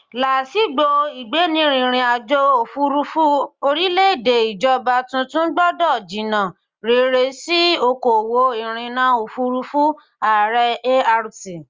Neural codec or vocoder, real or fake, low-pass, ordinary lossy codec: none; real; 7.2 kHz; Opus, 32 kbps